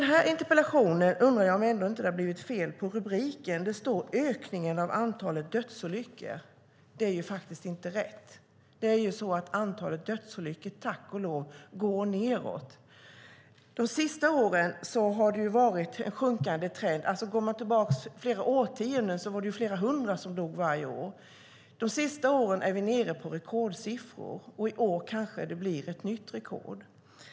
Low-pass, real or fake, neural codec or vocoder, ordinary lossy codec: none; real; none; none